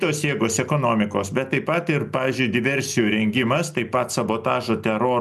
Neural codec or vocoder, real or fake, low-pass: none; real; 14.4 kHz